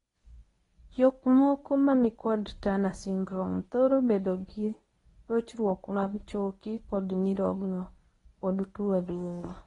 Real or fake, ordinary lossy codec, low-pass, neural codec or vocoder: fake; AAC, 32 kbps; 10.8 kHz; codec, 24 kHz, 0.9 kbps, WavTokenizer, medium speech release version 1